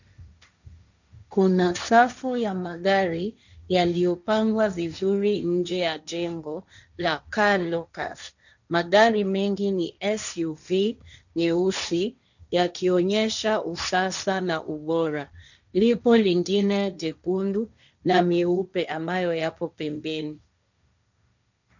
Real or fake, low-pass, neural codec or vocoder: fake; 7.2 kHz; codec, 16 kHz, 1.1 kbps, Voila-Tokenizer